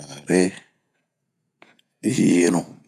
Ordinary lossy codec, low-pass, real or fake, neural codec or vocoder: none; 14.4 kHz; fake; vocoder, 48 kHz, 128 mel bands, Vocos